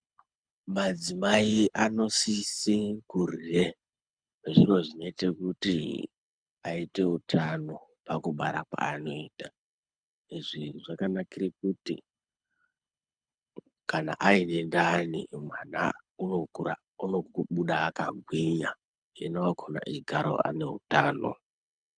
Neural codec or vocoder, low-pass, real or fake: codec, 24 kHz, 6 kbps, HILCodec; 9.9 kHz; fake